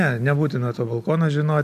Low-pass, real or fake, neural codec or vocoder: 14.4 kHz; real; none